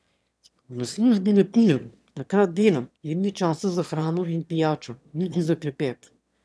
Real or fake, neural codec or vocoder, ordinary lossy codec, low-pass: fake; autoencoder, 22.05 kHz, a latent of 192 numbers a frame, VITS, trained on one speaker; none; none